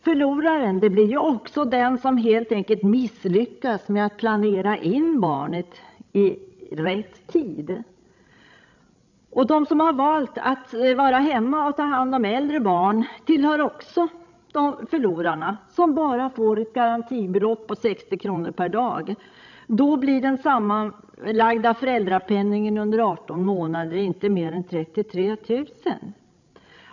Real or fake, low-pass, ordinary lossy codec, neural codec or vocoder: fake; 7.2 kHz; none; codec, 16 kHz, 16 kbps, FreqCodec, larger model